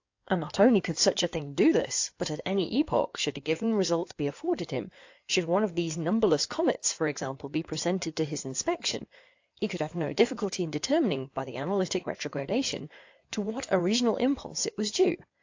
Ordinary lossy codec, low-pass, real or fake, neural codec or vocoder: AAC, 48 kbps; 7.2 kHz; fake; codec, 16 kHz in and 24 kHz out, 2.2 kbps, FireRedTTS-2 codec